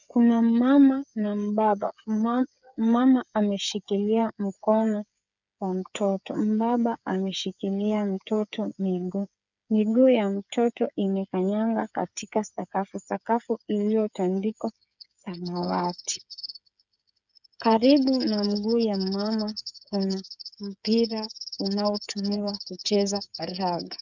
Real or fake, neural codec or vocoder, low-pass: fake; codec, 16 kHz, 8 kbps, FreqCodec, smaller model; 7.2 kHz